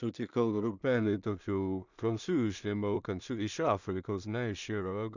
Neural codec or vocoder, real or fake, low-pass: codec, 16 kHz in and 24 kHz out, 0.4 kbps, LongCat-Audio-Codec, two codebook decoder; fake; 7.2 kHz